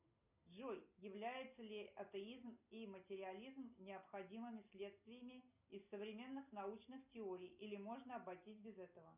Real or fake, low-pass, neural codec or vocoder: real; 3.6 kHz; none